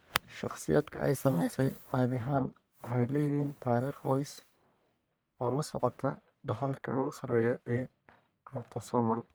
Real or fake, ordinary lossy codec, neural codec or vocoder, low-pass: fake; none; codec, 44.1 kHz, 1.7 kbps, Pupu-Codec; none